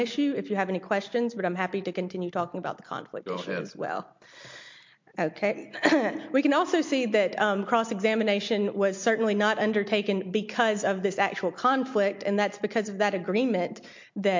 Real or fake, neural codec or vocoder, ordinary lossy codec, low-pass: real; none; MP3, 48 kbps; 7.2 kHz